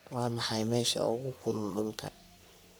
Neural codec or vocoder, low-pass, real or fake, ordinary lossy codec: codec, 44.1 kHz, 3.4 kbps, Pupu-Codec; none; fake; none